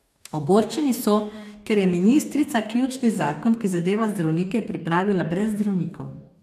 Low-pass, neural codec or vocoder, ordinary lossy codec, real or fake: 14.4 kHz; codec, 44.1 kHz, 2.6 kbps, DAC; none; fake